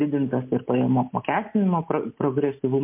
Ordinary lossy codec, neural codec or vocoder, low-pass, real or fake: MP3, 32 kbps; none; 3.6 kHz; real